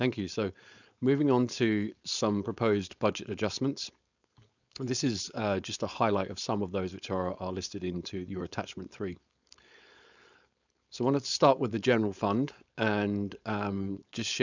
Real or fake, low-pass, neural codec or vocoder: fake; 7.2 kHz; codec, 16 kHz, 4.8 kbps, FACodec